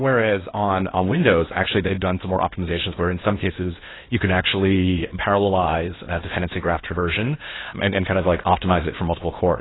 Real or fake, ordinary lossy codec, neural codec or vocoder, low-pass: fake; AAC, 16 kbps; codec, 16 kHz in and 24 kHz out, 0.8 kbps, FocalCodec, streaming, 65536 codes; 7.2 kHz